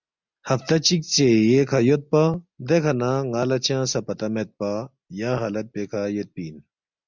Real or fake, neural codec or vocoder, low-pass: real; none; 7.2 kHz